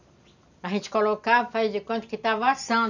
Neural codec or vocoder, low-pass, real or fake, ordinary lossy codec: none; 7.2 kHz; real; none